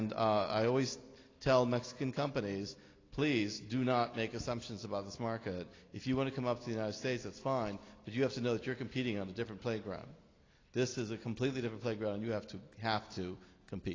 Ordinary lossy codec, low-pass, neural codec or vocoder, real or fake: AAC, 32 kbps; 7.2 kHz; none; real